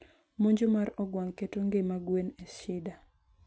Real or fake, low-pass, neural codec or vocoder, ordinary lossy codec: real; none; none; none